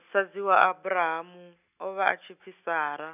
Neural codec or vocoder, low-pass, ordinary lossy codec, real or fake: none; 3.6 kHz; none; real